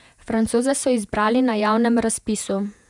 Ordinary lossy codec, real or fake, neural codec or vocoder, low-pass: none; fake; vocoder, 48 kHz, 128 mel bands, Vocos; 10.8 kHz